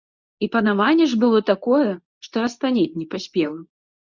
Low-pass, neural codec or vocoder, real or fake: 7.2 kHz; codec, 24 kHz, 0.9 kbps, WavTokenizer, medium speech release version 2; fake